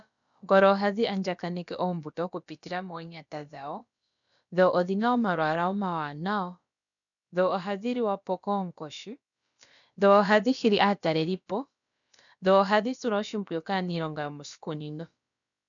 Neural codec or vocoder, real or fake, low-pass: codec, 16 kHz, about 1 kbps, DyCAST, with the encoder's durations; fake; 7.2 kHz